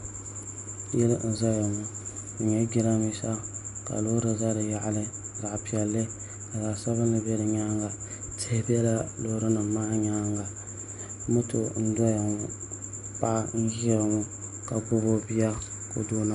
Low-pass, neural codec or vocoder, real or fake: 10.8 kHz; none; real